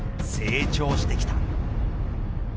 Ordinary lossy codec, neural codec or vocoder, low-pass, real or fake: none; none; none; real